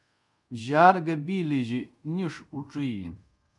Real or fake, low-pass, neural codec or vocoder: fake; 10.8 kHz; codec, 24 kHz, 0.5 kbps, DualCodec